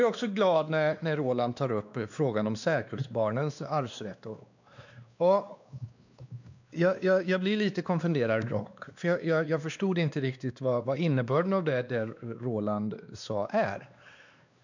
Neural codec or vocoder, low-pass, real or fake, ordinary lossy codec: codec, 16 kHz, 2 kbps, X-Codec, WavLM features, trained on Multilingual LibriSpeech; 7.2 kHz; fake; none